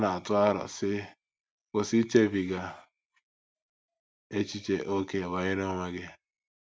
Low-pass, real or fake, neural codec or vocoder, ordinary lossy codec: none; real; none; none